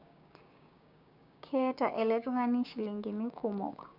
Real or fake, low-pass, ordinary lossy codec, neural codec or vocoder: fake; 5.4 kHz; none; codec, 16 kHz, 6 kbps, DAC